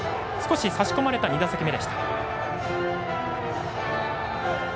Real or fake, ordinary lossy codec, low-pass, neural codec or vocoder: real; none; none; none